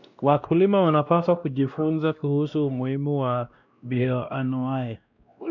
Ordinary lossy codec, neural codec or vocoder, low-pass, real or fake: none; codec, 16 kHz, 1 kbps, X-Codec, WavLM features, trained on Multilingual LibriSpeech; 7.2 kHz; fake